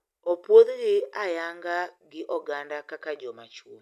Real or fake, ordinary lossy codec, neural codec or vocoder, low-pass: real; none; none; 14.4 kHz